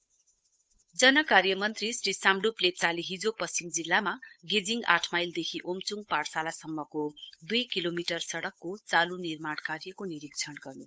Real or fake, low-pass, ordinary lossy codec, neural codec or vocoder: fake; none; none; codec, 16 kHz, 8 kbps, FunCodec, trained on Chinese and English, 25 frames a second